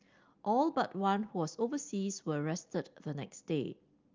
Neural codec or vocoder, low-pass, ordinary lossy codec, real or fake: none; 7.2 kHz; Opus, 24 kbps; real